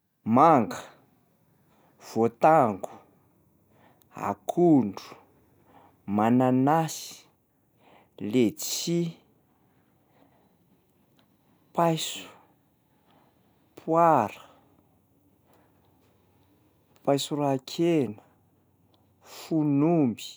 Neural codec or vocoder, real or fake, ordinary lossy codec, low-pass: none; real; none; none